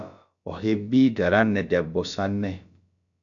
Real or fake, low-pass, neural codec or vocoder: fake; 7.2 kHz; codec, 16 kHz, about 1 kbps, DyCAST, with the encoder's durations